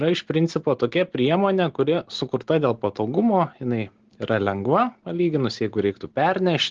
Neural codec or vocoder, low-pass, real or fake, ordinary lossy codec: none; 7.2 kHz; real; Opus, 16 kbps